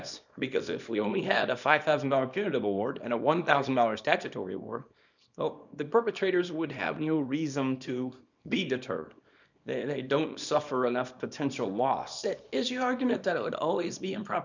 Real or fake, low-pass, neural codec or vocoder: fake; 7.2 kHz; codec, 24 kHz, 0.9 kbps, WavTokenizer, small release